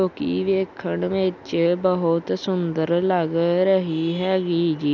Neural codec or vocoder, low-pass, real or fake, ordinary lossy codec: none; 7.2 kHz; real; none